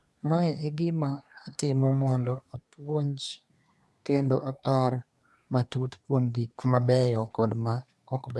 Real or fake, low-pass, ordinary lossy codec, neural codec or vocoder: fake; none; none; codec, 24 kHz, 1 kbps, SNAC